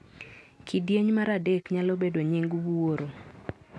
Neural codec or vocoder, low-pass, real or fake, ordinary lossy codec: none; none; real; none